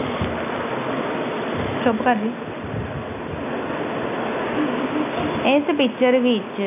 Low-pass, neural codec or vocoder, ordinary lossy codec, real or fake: 3.6 kHz; none; none; real